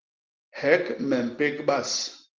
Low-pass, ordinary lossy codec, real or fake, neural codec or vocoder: 7.2 kHz; Opus, 16 kbps; real; none